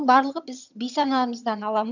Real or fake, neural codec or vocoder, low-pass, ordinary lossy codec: fake; vocoder, 22.05 kHz, 80 mel bands, HiFi-GAN; 7.2 kHz; none